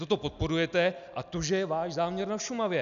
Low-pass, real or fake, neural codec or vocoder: 7.2 kHz; real; none